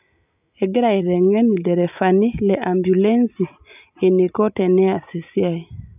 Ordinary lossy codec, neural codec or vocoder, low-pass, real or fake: none; none; 3.6 kHz; real